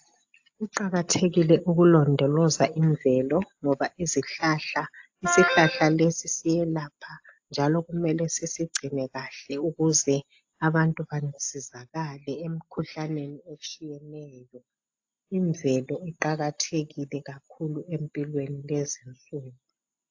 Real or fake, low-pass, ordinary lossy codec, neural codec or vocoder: real; 7.2 kHz; AAC, 48 kbps; none